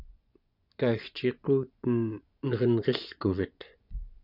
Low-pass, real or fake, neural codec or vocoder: 5.4 kHz; fake; vocoder, 24 kHz, 100 mel bands, Vocos